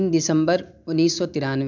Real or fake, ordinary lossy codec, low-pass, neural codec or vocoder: real; MP3, 64 kbps; 7.2 kHz; none